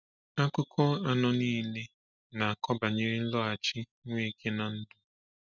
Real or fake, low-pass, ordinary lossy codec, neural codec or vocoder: real; 7.2 kHz; none; none